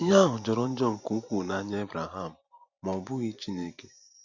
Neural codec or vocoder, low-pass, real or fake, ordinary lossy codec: vocoder, 44.1 kHz, 128 mel bands every 512 samples, BigVGAN v2; 7.2 kHz; fake; none